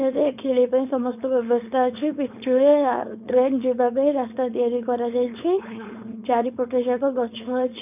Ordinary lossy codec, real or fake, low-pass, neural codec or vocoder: none; fake; 3.6 kHz; codec, 16 kHz, 4.8 kbps, FACodec